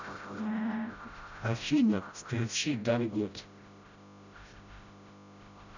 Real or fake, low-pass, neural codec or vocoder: fake; 7.2 kHz; codec, 16 kHz, 0.5 kbps, FreqCodec, smaller model